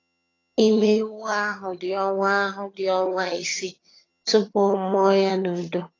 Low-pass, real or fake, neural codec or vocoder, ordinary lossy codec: 7.2 kHz; fake; vocoder, 22.05 kHz, 80 mel bands, HiFi-GAN; AAC, 32 kbps